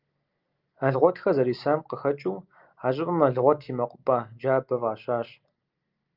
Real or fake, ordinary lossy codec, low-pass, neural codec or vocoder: real; Opus, 24 kbps; 5.4 kHz; none